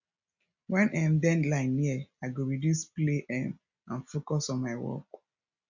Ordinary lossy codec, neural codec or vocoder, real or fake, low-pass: none; none; real; 7.2 kHz